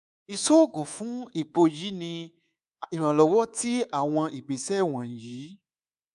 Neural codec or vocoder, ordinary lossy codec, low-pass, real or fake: codec, 24 kHz, 3.1 kbps, DualCodec; none; 10.8 kHz; fake